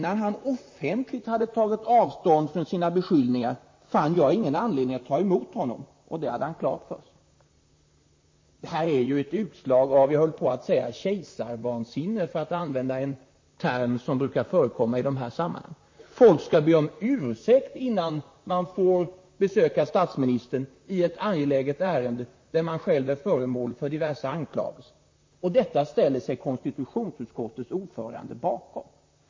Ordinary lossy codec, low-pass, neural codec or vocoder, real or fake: MP3, 32 kbps; 7.2 kHz; vocoder, 44.1 kHz, 128 mel bands, Pupu-Vocoder; fake